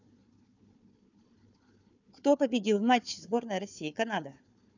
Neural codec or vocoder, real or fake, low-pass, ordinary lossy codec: codec, 16 kHz, 4 kbps, FunCodec, trained on Chinese and English, 50 frames a second; fake; 7.2 kHz; none